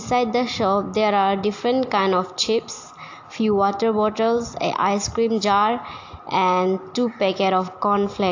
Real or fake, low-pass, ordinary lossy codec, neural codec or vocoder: real; 7.2 kHz; AAC, 48 kbps; none